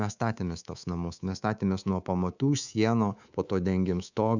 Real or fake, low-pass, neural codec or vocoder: fake; 7.2 kHz; codec, 24 kHz, 3.1 kbps, DualCodec